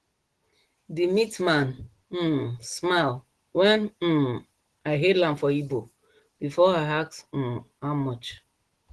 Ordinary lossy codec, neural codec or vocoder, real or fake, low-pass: Opus, 16 kbps; none; real; 10.8 kHz